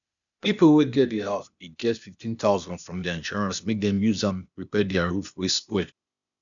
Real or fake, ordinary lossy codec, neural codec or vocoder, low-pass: fake; none; codec, 16 kHz, 0.8 kbps, ZipCodec; 7.2 kHz